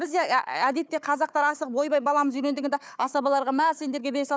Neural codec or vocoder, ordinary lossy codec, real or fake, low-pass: codec, 16 kHz, 4 kbps, FunCodec, trained on Chinese and English, 50 frames a second; none; fake; none